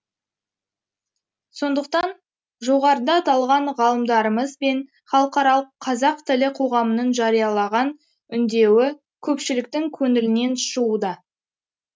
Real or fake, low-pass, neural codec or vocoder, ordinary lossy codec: real; none; none; none